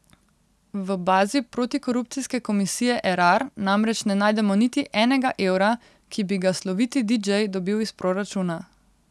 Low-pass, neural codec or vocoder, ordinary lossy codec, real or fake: none; none; none; real